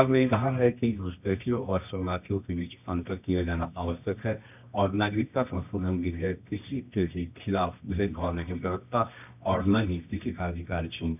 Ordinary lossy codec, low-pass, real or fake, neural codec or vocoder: none; 3.6 kHz; fake; codec, 24 kHz, 0.9 kbps, WavTokenizer, medium music audio release